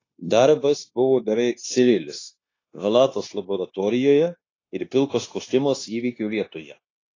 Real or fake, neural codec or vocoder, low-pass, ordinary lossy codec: fake; codec, 16 kHz, 0.9 kbps, LongCat-Audio-Codec; 7.2 kHz; AAC, 32 kbps